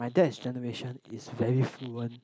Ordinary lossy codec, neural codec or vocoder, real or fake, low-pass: none; none; real; none